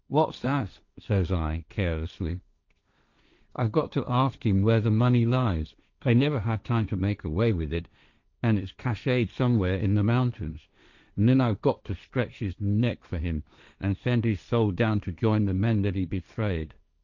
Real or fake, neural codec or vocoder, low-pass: fake; codec, 16 kHz, 1.1 kbps, Voila-Tokenizer; 7.2 kHz